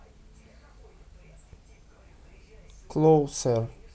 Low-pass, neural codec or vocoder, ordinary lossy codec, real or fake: none; none; none; real